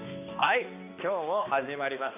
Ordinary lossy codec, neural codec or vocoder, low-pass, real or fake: none; codec, 16 kHz, 2 kbps, X-Codec, HuBERT features, trained on balanced general audio; 3.6 kHz; fake